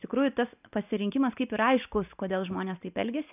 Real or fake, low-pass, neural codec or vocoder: real; 3.6 kHz; none